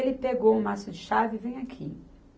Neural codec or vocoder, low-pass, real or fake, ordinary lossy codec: none; none; real; none